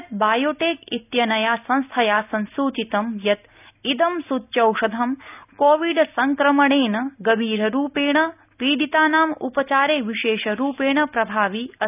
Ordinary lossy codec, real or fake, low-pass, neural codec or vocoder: none; real; 3.6 kHz; none